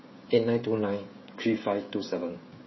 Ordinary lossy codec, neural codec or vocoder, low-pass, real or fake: MP3, 24 kbps; codec, 16 kHz, 16 kbps, FreqCodec, smaller model; 7.2 kHz; fake